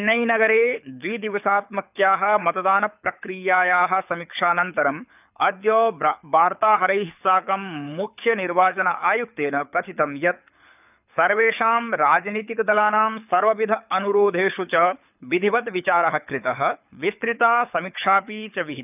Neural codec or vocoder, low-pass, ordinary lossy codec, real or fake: codec, 24 kHz, 6 kbps, HILCodec; 3.6 kHz; none; fake